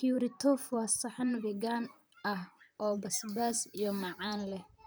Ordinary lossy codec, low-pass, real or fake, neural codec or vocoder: none; none; fake; vocoder, 44.1 kHz, 128 mel bands, Pupu-Vocoder